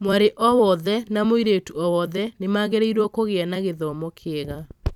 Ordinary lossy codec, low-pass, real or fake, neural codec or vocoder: none; 19.8 kHz; fake; vocoder, 44.1 kHz, 128 mel bands every 256 samples, BigVGAN v2